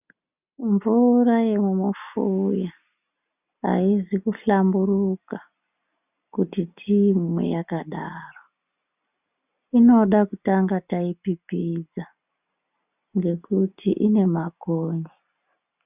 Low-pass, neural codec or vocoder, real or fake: 3.6 kHz; none; real